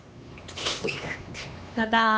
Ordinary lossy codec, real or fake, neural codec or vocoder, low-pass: none; fake; codec, 16 kHz, 2 kbps, X-Codec, HuBERT features, trained on LibriSpeech; none